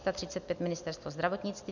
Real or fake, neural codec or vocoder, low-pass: real; none; 7.2 kHz